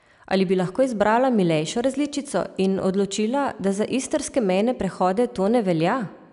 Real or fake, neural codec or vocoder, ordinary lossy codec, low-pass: real; none; none; 10.8 kHz